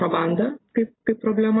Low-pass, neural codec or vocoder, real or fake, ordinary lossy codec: 7.2 kHz; none; real; AAC, 16 kbps